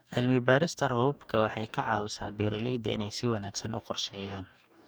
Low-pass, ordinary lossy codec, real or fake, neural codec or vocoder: none; none; fake; codec, 44.1 kHz, 2.6 kbps, DAC